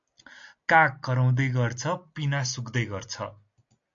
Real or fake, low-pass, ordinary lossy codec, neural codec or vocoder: real; 7.2 kHz; AAC, 64 kbps; none